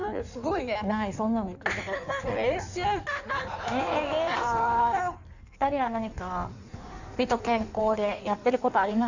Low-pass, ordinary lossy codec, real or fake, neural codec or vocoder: 7.2 kHz; none; fake; codec, 16 kHz in and 24 kHz out, 1.1 kbps, FireRedTTS-2 codec